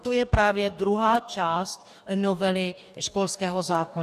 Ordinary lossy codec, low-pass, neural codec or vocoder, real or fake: AAC, 96 kbps; 14.4 kHz; codec, 44.1 kHz, 2.6 kbps, DAC; fake